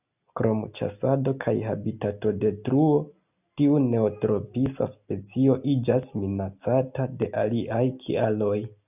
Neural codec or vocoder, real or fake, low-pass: none; real; 3.6 kHz